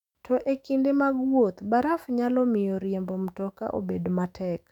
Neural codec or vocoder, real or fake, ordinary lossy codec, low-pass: autoencoder, 48 kHz, 128 numbers a frame, DAC-VAE, trained on Japanese speech; fake; none; 19.8 kHz